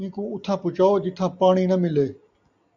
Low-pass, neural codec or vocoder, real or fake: 7.2 kHz; none; real